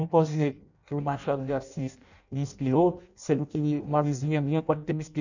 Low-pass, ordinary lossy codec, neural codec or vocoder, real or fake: 7.2 kHz; none; codec, 16 kHz in and 24 kHz out, 0.6 kbps, FireRedTTS-2 codec; fake